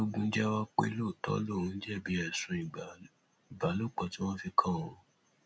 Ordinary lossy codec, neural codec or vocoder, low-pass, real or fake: none; none; none; real